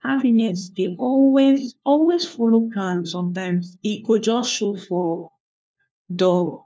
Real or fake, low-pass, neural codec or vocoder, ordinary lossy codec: fake; none; codec, 16 kHz, 1 kbps, FunCodec, trained on LibriTTS, 50 frames a second; none